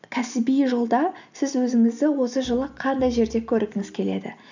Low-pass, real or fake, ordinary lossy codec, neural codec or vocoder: 7.2 kHz; real; none; none